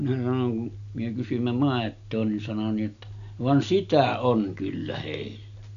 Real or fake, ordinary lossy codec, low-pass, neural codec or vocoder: real; none; 7.2 kHz; none